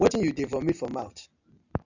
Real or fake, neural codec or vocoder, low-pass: real; none; 7.2 kHz